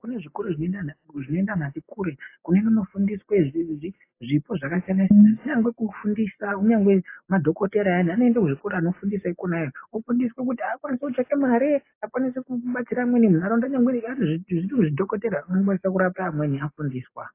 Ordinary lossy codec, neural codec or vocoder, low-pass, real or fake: AAC, 24 kbps; none; 3.6 kHz; real